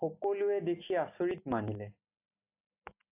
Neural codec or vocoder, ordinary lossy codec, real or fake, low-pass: none; AAC, 32 kbps; real; 3.6 kHz